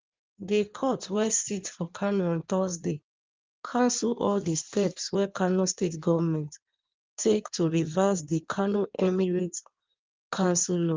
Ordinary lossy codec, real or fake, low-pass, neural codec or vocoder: Opus, 24 kbps; fake; 7.2 kHz; codec, 16 kHz in and 24 kHz out, 1.1 kbps, FireRedTTS-2 codec